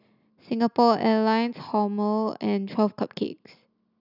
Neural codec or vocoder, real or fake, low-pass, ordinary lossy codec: none; real; 5.4 kHz; none